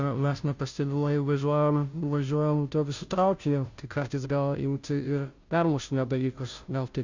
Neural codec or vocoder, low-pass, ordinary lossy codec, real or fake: codec, 16 kHz, 0.5 kbps, FunCodec, trained on Chinese and English, 25 frames a second; 7.2 kHz; Opus, 64 kbps; fake